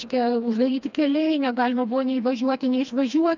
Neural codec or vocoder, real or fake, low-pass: codec, 16 kHz, 2 kbps, FreqCodec, smaller model; fake; 7.2 kHz